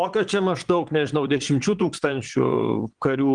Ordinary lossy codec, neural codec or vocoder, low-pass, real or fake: Opus, 24 kbps; vocoder, 22.05 kHz, 80 mel bands, Vocos; 9.9 kHz; fake